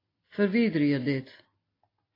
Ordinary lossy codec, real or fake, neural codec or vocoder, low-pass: AAC, 24 kbps; real; none; 5.4 kHz